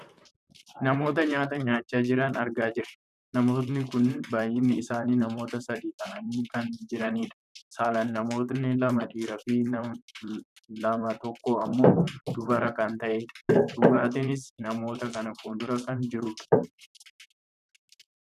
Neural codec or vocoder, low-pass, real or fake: vocoder, 44.1 kHz, 128 mel bands, Pupu-Vocoder; 14.4 kHz; fake